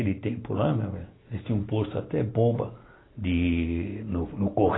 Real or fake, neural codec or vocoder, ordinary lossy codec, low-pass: real; none; AAC, 16 kbps; 7.2 kHz